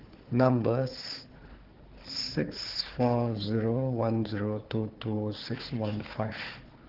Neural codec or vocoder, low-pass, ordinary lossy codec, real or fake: codec, 16 kHz, 4 kbps, FunCodec, trained on Chinese and English, 50 frames a second; 5.4 kHz; Opus, 16 kbps; fake